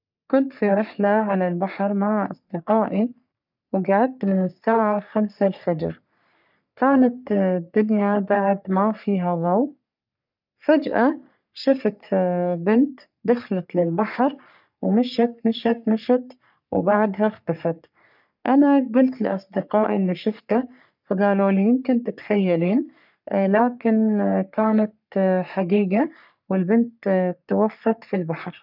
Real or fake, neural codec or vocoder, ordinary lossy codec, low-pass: fake; codec, 44.1 kHz, 3.4 kbps, Pupu-Codec; none; 5.4 kHz